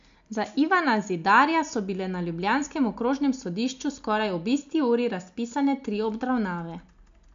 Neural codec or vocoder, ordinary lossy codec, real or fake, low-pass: none; AAC, 64 kbps; real; 7.2 kHz